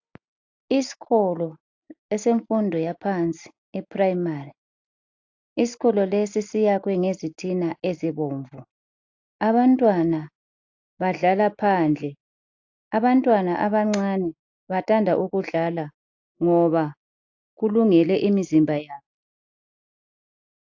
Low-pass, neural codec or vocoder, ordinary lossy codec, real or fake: 7.2 kHz; none; AAC, 48 kbps; real